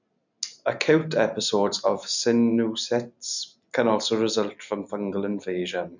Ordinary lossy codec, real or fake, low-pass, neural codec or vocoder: none; real; 7.2 kHz; none